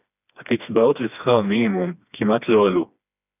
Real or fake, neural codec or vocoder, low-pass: fake; codec, 16 kHz, 2 kbps, FreqCodec, smaller model; 3.6 kHz